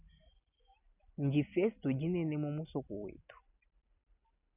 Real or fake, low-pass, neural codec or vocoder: real; 3.6 kHz; none